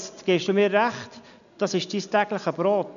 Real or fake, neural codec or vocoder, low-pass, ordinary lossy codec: real; none; 7.2 kHz; none